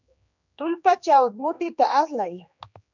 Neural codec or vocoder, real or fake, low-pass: codec, 16 kHz, 2 kbps, X-Codec, HuBERT features, trained on general audio; fake; 7.2 kHz